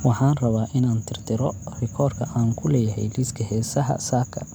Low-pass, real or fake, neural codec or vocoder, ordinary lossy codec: none; real; none; none